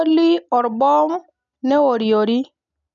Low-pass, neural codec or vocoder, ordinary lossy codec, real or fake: 7.2 kHz; none; none; real